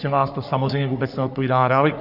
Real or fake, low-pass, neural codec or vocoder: fake; 5.4 kHz; codec, 44.1 kHz, 3.4 kbps, Pupu-Codec